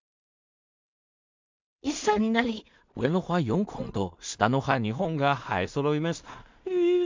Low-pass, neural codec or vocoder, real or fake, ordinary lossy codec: 7.2 kHz; codec, 16 kHz in and 24 kHz out, 0.4 kbps, LongCat-Audio-Codec, two codebook decoder; fake; MP3, 64 kbps